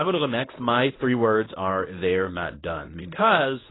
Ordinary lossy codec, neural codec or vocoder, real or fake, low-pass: AAC, 16 kbps; codec, 24 kHz, 0.9 kbps, WavTokenizer, medium speech release version 1; fake; 7.2 kHz